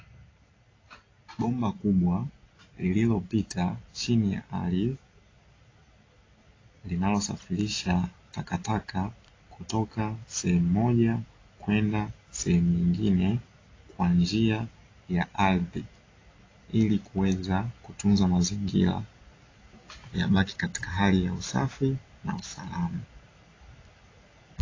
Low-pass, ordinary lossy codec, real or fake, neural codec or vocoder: 7.2 kHz; AAC, 32 kbps; real; none